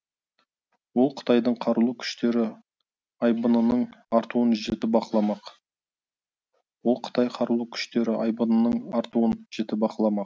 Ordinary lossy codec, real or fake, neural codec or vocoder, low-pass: none; real; none; none